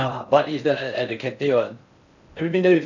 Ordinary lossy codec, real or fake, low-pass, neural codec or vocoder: none; fake; 7.2 kHz; codec, 16 kHz in and 24 kHz out, 0.6 kbps, FocalCodec, streaming, 4096 codes